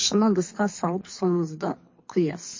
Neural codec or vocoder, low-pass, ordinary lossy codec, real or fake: codec, 24 kHz, 3 kbps, HILCodec; 7.2 kHz; MP3, 32 kbps; fake